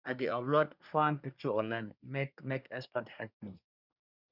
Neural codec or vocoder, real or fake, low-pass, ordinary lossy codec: codec, 24 kHz, 1 kbps, SNAC; fake; 5.4 kHz; none